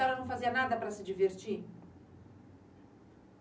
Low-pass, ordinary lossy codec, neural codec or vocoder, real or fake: none; none; none; real